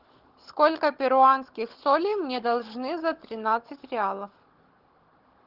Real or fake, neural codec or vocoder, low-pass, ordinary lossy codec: fake; codec, 16 kHz, 16 kbps, FunCodec, trained on Chinese and English, 50 frames a second; 5.4 kHz; Opus, 16 kbps